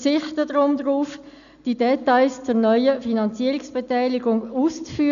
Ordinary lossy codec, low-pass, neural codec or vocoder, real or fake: none; 7.2 kHz; none; real